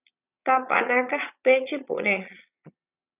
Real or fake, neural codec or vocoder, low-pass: fake; vocoder, 22.05 kHz, 80 mel bands, Vocos; 3.6 kHz